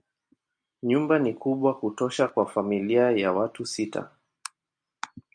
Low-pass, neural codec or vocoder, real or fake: 9.9 kHz; none; real